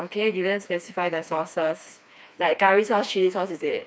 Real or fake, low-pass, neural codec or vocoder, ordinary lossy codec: fake; none; codec, 16 kHz, 2 kbps, FreqCodec, smaller model; none